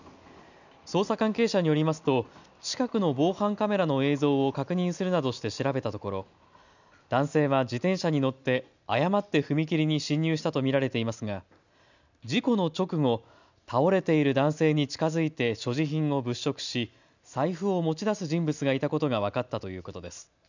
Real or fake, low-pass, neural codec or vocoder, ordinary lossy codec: real; 7.2 kHz; none; none